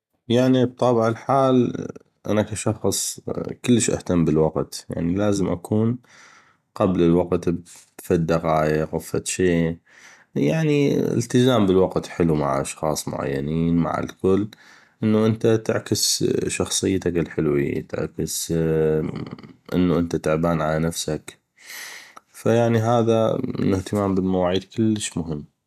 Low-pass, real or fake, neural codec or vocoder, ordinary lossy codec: 14.4 kHz; real; none; none